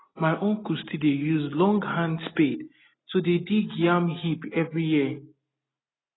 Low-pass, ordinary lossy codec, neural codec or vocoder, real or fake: 7.2 kHz; AAC, 16 kbps; none; real